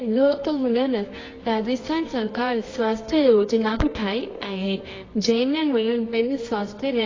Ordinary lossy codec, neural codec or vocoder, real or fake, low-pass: AAC, 32 kbps; codec, 24 kHz, 0.9 kbps, WavTokenizer, medium music audio release; fake; 7.2 kHz